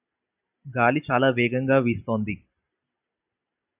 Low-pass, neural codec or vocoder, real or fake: 3.6 kHz; none; real